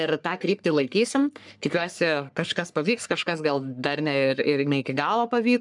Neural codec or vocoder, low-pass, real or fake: codec, 44.1 kHz, 3.4 kbps, Pupu-Codec; 10.8 kHz; fake